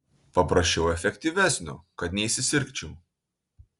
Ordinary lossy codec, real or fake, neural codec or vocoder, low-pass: Opus, 64 kbps; real; none; 10.8 kHz